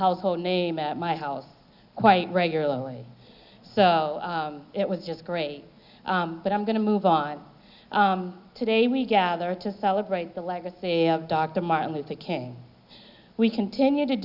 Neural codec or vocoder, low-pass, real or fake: none; 5.4 kHz; real